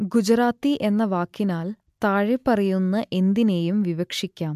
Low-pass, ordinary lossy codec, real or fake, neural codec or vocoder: 14.4 kHz; MP3, 96 kbps; real; none